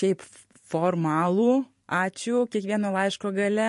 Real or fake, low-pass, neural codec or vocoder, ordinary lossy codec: fake; 14.4 kHz; vocoder, 44.1 kHz, 128 mel bands every 512 samples, BigVGAN v2; MP3, 48 kbps